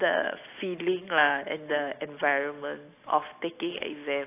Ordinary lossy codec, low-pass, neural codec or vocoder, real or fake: AAC, 24 kbps; 3.6 kHz; none; real